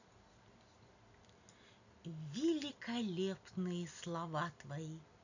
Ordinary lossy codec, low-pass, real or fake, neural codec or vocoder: none; 7.2 kHz; real; none